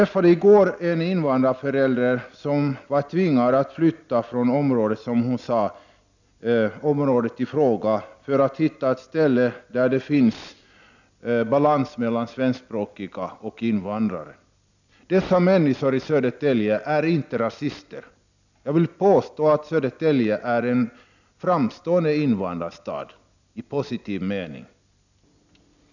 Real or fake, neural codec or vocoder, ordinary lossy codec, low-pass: real; none; none; 7.2 kHz